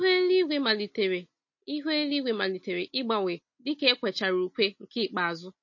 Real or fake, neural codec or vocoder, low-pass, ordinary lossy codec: real; none; 7.2 kHz; MP3, 32 kbps